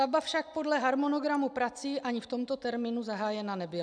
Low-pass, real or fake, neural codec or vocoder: 9.9 kHz; real; none